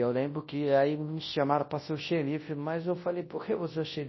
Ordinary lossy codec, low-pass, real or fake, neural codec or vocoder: MP3, 24 kbps; 7.2 kHz; fake; codec, 24 kHz, 0.9 kbps, WavTokenizer, large speech release